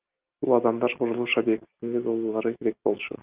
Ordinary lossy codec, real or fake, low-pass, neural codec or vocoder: Opus, 32 kbps; real; 3.6 kHz; none